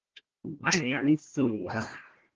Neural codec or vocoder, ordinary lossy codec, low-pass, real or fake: codec, 16 kHz, 1 kbps, FunCodec, trained on Chinese and English, 50 frames a second; Opus, 16 kbps; 7.2 kHz; fake